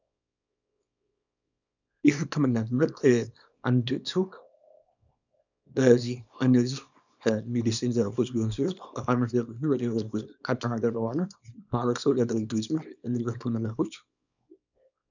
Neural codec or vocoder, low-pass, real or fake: codec, 24 kHz, 0.9 kbps, WavTokenizer, small release; 7.2 kHz; fake